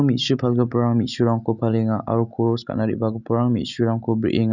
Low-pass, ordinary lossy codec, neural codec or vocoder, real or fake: 7.2 kHz; none; none; real